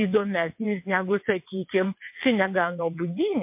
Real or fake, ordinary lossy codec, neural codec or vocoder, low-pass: fake; MP3, 32 kbps; vocoder, 22.05 kHz, 80 mel bands, WaveNeXt; 3.6 kHz